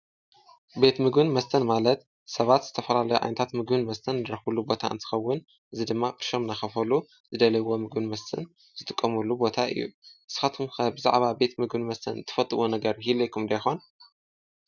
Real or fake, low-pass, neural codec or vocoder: real; 7.2 kHz; none